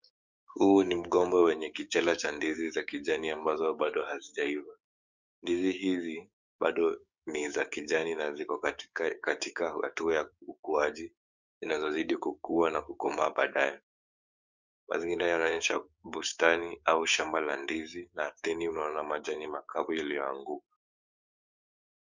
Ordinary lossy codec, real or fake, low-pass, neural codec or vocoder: Opus, 64 kbps; fake; 7.2 kHz; codec, 16 kHz, 6 kbps, DAC